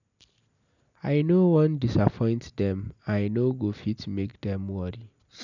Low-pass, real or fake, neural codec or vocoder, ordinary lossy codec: 7.2 kHz; real; none; none